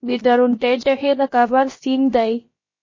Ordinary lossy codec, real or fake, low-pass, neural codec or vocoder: MP3, 32 kbps; fake; 7.2 kHz; codec, 16 kHz, about 1 kbps, DyCAST, with the encoder's durations